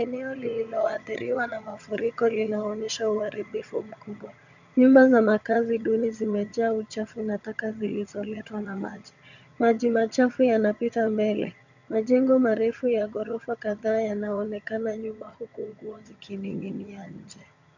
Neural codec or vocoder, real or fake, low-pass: vocoder, 22.05 kHz, 80 mel bands, HiFi-GAN; fake; 7.2 kHz